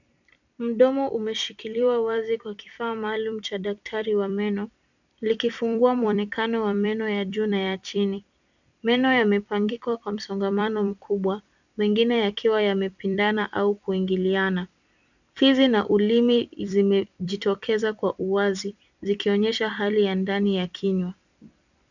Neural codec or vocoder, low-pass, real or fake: vocoder, 44.1 kHz, 128 mel bands every 256 samples, BigVGAN v2; 7.2 kHz; fake